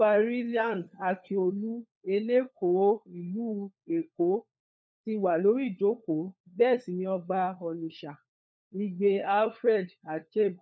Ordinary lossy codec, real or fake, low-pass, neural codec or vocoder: none; fake; none; codec, 16 kHz, 4 kbps, FunCodec, trained on LibriTTS, 50 frames a second